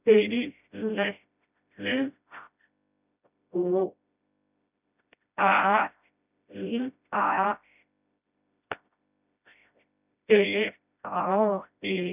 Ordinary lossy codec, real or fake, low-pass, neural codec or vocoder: none; fake; 3.6 kHz; codec, 16 kHz, 0.5 kbps, FreqCodec, smaller model